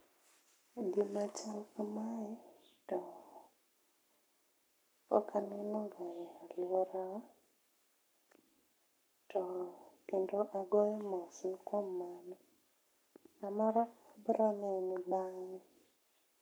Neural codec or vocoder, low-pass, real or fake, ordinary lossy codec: codec, 44.1 kHz, 7.8 kbps, Pupu-Codec; none; fake; none